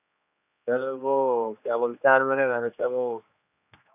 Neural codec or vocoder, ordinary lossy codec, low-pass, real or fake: codec, 16 kHz, 2 kbps, X-Codec, HuBERT features, trained on general audio; none; 3.6 kHz; fake